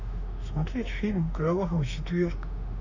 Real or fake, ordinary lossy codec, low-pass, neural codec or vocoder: fake; none; 7.2 kHz; autoencoder, 48 kHz, 32 numbers a frame, DAC-VAE, trained on Japanese speech